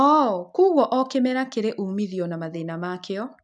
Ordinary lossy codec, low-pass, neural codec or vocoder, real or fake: none; 10.8 kHz; none; real